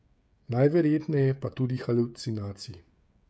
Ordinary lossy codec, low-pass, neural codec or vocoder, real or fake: none; none; codec, 16 kHz, 16 kbps, FreqCodec, smaller model; fake